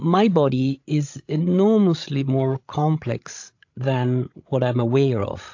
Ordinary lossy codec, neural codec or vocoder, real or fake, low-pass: AAC, 48 kbps; codec, 16 kHz, 16 kbps, FreqCodec, larger model; fake; 7.2 kHz